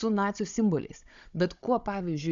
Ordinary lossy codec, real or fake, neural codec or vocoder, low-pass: Opus, 64 kbps; fake; codec, 16 kHz, 16 kbps, FunCodec, trained on Chinese and English, 50 frames a second; 7.2 kHz